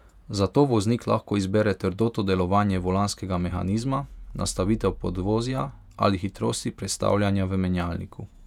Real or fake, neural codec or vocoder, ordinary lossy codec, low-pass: fake; vocoder, 48 kHz, 128 mel bands, Vocos; none; 19.8 kHz